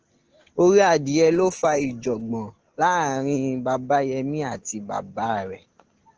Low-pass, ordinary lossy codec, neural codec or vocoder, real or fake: 7.2 kHz; Opus, 16 kbps; none; real